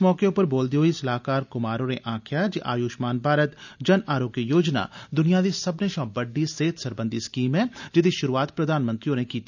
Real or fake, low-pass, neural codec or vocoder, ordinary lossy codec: real; 7.2 kHz; none; none